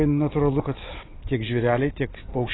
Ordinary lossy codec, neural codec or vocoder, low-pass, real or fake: AAC, 16 kbps; none; 7.2 kHz; real